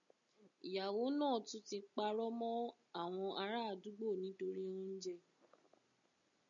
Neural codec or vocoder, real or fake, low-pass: none; real; 7.2 kHz